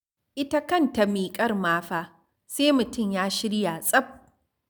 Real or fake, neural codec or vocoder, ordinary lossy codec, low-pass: real; none; none; none